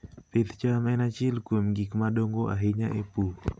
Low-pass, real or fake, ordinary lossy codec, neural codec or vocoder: none; real; none; none